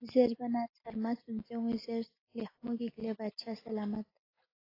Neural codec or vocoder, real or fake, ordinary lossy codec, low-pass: none; real; AAC, 24 kbps; 5.4 kHz